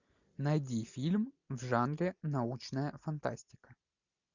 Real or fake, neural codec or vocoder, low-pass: real; none; 7.2 kHz